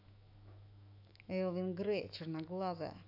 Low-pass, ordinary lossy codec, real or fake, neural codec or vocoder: 5.4 kHz; none; fake; autoencoder, 48 kHz, 128 numbers a frame, DAC-VAE, trained on Japanese speech